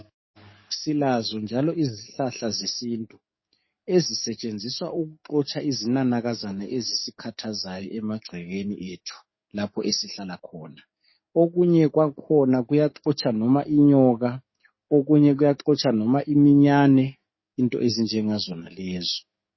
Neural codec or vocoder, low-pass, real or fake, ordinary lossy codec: codec, 44.1 kHz, 7.8 kbps, DAC; 7.2 kHz; fake; MP3, 24 kbps